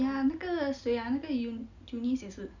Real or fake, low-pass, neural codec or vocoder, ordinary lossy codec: real; 7.2 kHz; none; none